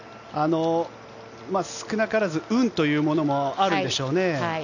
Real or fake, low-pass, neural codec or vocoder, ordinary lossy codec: real; 7.2 kHz; none; none